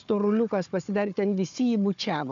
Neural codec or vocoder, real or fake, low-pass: codec, 16 kHz, 4 kbps, FunCodec, trained on Chinese and English, 50 frames a second; fake; 7.2 kHz